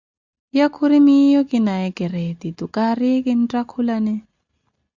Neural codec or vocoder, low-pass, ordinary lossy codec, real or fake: none; 7.2 kHz; Opus, 64 kbps; real